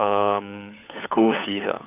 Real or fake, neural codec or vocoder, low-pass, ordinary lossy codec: fake; codec, 16 kHz, 4 kbps, FunCodec, trained on LibriTTS, 50 frames a second; 3.6 kHz; none